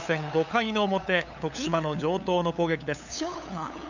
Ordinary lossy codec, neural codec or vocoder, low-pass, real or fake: none; codec, 16 kHz, 8 kbps, FunCodec, trained on LibriTTS, 25 frames a second; 7.2 kHz; fake